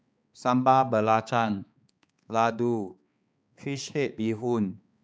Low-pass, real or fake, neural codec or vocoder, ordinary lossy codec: none; fake; codec, 16 kHz, 4 kbps, X-Codec, HuBERT features, trained on balanced general audio; none